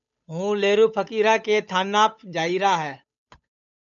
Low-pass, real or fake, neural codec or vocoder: 7.2 kHz; fake; codec, 16 kHz, 8 kbps, FunCodec, trained on Chinese and English, 25 frames a second